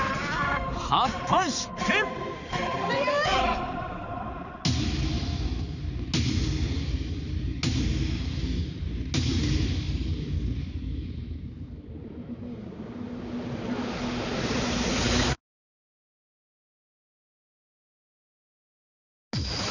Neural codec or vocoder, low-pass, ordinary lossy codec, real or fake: codec, 16 kHz, 4 kbps, X-Codec, HuBERT features, trained on balanced general audio; 7.2 kHz; none; fake